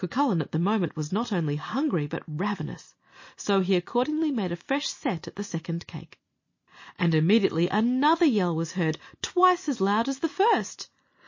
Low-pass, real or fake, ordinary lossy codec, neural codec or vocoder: 7.2 kHz; real; MP3, 32 kbps; none